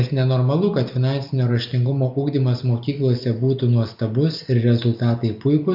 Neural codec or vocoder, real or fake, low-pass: none; real; 5.4 kHz